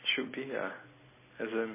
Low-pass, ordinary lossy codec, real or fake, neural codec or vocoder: 3.6 kHz; MP3, 16 kbps; real; none